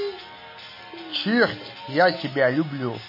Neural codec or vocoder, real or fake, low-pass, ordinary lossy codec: none; real; 5.4 kHz; MP3, 24 kbps